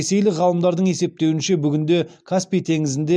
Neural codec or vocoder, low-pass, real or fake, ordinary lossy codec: none; none; real; none